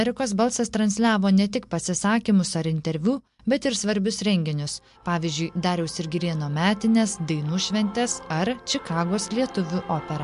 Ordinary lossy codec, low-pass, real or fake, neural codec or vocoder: MP3, 64 kbps; 10.8 kHz; real; none